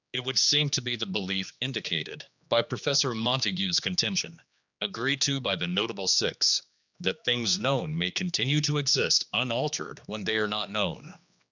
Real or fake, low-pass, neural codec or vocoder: fake; 7.2 kHz; codec, 16 kHz, 2 kbps, X-Codec, HuBERT features, trained on general audio